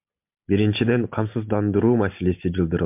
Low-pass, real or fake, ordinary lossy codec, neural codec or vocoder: 3.6 kHz; real; MP3, 32 kbps; none